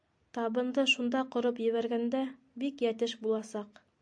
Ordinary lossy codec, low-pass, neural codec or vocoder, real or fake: AAC, 64 kbps; 9.9 kHz; none; real